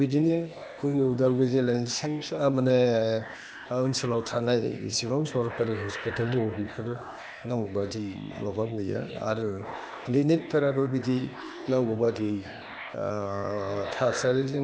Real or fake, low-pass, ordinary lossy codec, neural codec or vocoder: fake; none; none; codec, 16 kHz, 0.8 kbps, ZipCodec